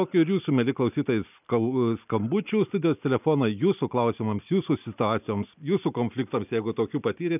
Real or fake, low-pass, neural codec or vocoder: real; 3.6 kHz; none